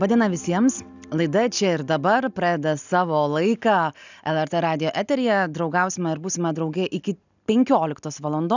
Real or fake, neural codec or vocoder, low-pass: real; none; 7.2 kHz